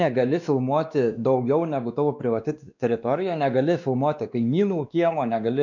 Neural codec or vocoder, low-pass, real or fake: codec, 16 kHz, 2 kbps, X-Codec, WavLM features, trained on Multilingual LibriSpeech; 7.2 kHz; fake